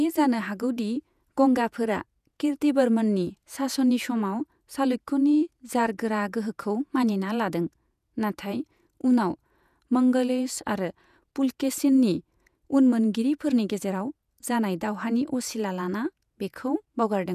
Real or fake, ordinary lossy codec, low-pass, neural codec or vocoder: fake; none; 14.4 kHz; vocoder, 48 kHz, 128 mel bands, Vocos